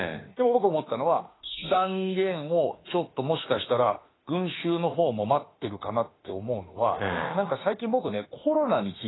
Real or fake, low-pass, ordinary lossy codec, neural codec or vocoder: fake; 7.2 kHz; AAC, 16 kbps; autoencoder, 48 kHz, 32 numbers a frame, DAC-VAE, trained on Japanese speech